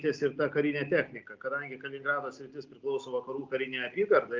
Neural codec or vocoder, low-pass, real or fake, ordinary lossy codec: none; 7.2 kHz; real; Opus, 24 kbps